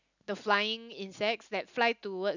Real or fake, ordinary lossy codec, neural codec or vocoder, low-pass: real; none; none; 7.2 kHz